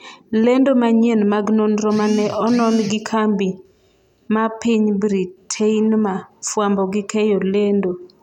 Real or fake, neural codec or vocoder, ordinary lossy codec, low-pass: real; none; none; 19.8 kHz